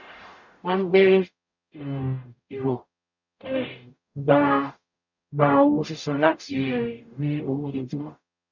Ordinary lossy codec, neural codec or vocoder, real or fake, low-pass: none; codec, 44.1 kHz, 0.9 kbps, DAC; fake; 7.2 kHz